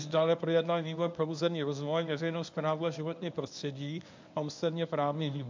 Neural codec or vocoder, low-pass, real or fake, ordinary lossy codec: codec, 24 kHz, 0.9 kbps, WavTokenizer, medium speech release version 1; 7.2 kHz; fake; MP3, 64 kbps